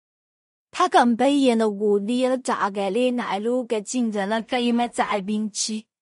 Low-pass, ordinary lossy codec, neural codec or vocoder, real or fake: 10.8 kHz; MP3, 48 kbps; codec, 16 kHz in and 24 kHz out, 0.4 kbps, LongCat-Audio-Codec, two codebook decoder; fake